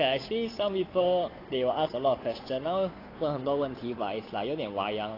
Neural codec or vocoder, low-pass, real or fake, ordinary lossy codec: codec, 16 kHz, 8 kbps, FunCodec, trained on Chinese and English, 25 frames a second; 5.4 kHz; fake; AAC, 24 kbps